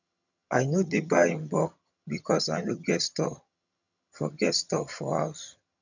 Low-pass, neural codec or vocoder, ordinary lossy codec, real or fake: 7.2 kHz; vocoder, 22.05 kHz, 80 mel bands, HiFi-GAN; none; fake